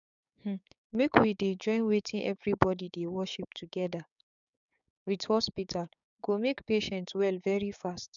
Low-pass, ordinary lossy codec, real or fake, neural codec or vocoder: 7.2 kHz; none; fake; codec, 16 kHz, 6 kbps, DAC